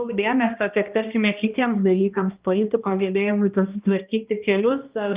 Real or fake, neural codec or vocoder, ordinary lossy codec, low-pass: fake; codec, 16 kHz, 1 kbps, X-Codec, HuBERT features, trained on balanced general audio; Opus, 24 kbps; 3.6 kHz